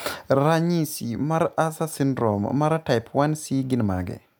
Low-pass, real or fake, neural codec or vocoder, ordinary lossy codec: none; real; none; none